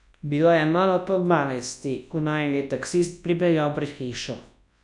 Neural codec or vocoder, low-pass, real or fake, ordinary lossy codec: codec, 24 kHz, 0.9 kbps, WavTokenizer, large speech release; 10.8 kHz; fake; none